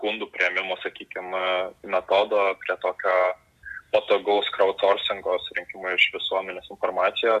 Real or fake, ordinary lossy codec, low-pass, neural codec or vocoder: real; AAC, 96 kbps; 14.4 kHz; none